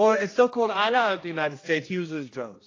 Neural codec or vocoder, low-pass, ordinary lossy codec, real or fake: codec, 16 kHz, 1 kbps, X-Codec, HuBERT features, trained on general audio; 7.2 kHz; AAC, 32 kbps; fake